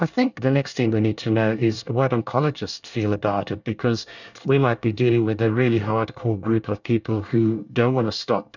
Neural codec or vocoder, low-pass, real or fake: codec, 24 kHz, 1 kbps, SNAC; 7.2 kHz; fake